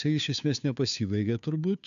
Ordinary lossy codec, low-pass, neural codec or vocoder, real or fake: AAC, 96 kbps; 7.2 kHz; codec, 16 kHz, 2 kbps, FunCodec, trained on Chinese and English, 25 frames a second; fake